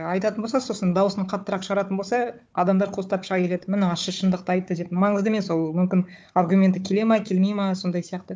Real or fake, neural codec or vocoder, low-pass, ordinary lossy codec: fake; codec, 16 kHz, 4 kbps, FunCodec, trained on Chinese and English, 50 frames a second; none; none